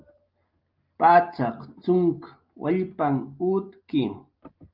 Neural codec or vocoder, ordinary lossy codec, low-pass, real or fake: none; Opus, 24 kbps; 5.4 kHz; real